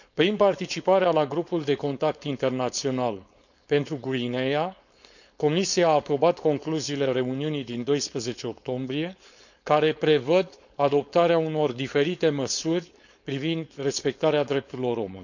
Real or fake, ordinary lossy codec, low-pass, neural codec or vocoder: fake; none; 7.2 kHz; codec, 16 kHz, 4.8 kbps, FACodec